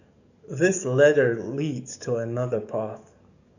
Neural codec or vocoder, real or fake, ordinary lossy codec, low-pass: codec, 44.1 kHz, 7.8 kbps, DAC; fake; none; 7.2 kHz